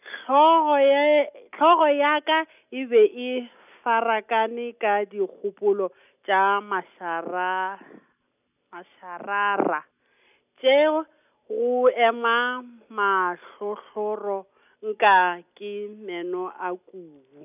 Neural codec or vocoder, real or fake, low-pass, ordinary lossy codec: none; real; 3.6 kHz; none